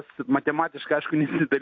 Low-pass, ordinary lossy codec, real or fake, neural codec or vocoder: 7.2 kHz; AAC, 48 kbps; real; none